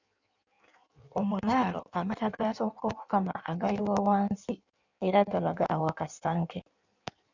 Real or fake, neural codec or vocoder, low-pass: fake; codec, 16 kHz in and 24 kHz out, 1.1 kbps, FireRedTTS-2 codec; 7.2 kHz